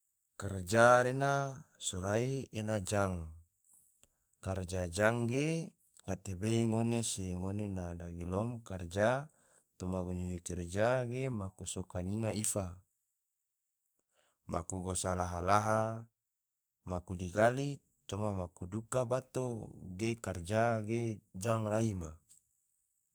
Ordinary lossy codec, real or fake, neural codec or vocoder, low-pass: none; fake; codec, 44.1 kHz, 2.6 kbps, SNAC; none